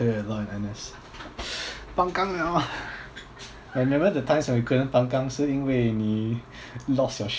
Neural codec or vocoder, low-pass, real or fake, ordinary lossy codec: none; none; real; none